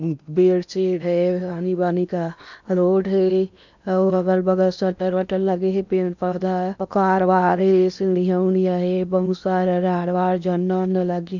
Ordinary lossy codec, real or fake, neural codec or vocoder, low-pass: none; fake; codec, 16 kHz in and 24 kHz out, 0.8 kbps, FocalCodec, streaming, 65536 codes; 7.2 kHz